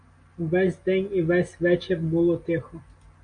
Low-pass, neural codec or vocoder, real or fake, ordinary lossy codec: 9.9 kHz; none; real; AAC, 48 kbps